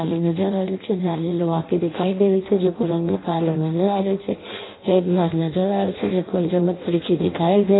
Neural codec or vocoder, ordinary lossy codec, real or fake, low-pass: codec, 16 kHz in and 24 kHz out, 0.6 kbps, FireRedTTS-2 codec; AAC, 16 kbps; fake; 7.2 kHz